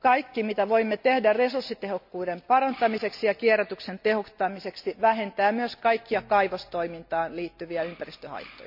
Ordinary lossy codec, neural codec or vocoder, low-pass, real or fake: MP3, 48 kbps; none; 5.4 kHz; real